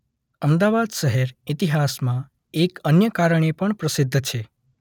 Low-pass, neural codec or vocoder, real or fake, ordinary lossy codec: 19.8 kHz; none; real; none